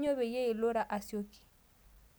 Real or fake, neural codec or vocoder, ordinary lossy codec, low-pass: real; none; none; none